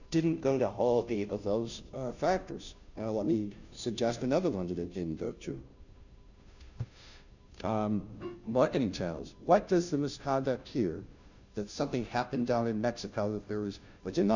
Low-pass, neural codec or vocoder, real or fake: 7.2 kHz; codec, 16 kHz, 0.5 kbps, FunCodec, trained on Chinese and English, 25 frames a second; fake